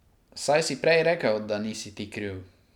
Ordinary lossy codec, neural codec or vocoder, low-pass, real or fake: none; none; 19.8 kHz; real